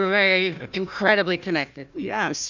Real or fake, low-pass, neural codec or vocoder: fake; 7.2 kHz; codec, 16 kHz, 1 kbps, FunCodec, trained on Chinese and English, 50 frames a second